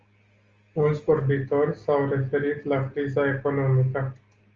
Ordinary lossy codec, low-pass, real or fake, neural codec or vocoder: Opus, 32 kbps; 7.2 kHz; real; none